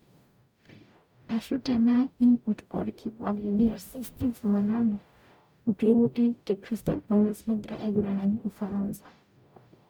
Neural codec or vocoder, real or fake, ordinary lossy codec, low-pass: codec, 44.1 kHz, 0.9 kbps, DAC; fake; none; none